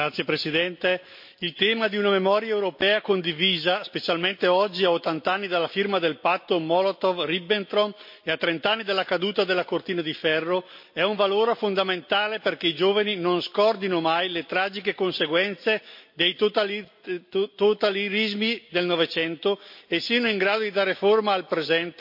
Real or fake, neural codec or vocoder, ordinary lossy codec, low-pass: real; none; MP3, 32 kbps; 5.4 kHz